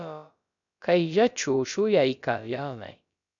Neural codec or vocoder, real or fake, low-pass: codec, 16 kHz, about 1 kbps, DyCAST, with the encoder's durations; fake; 7.2 kHz